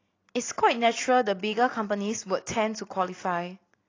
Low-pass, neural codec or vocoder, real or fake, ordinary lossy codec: 7.2 kHz; none; real; AAC, 32 kbps